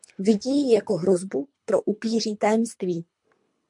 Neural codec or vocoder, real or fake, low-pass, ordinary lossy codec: codec, 24 kHz, 3 kbps, HILCodec; fake; 10.8 kHz; MP3, 64 kbps